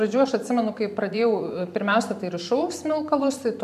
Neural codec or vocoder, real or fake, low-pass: vocoder, 44.1 kHz, 128 mel bands every 512 samples, BigVGAN v2; fake; 14.4 kHz